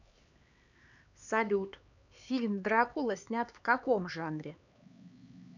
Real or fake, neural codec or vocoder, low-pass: fake; codec, 16 kHz, 4 kbps, X-Codec, HuBERT features, trained on LibriSpeech; 7.2 kHz